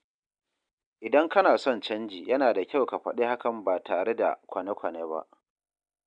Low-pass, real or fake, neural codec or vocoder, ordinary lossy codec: 9.9 kHz; real; none; none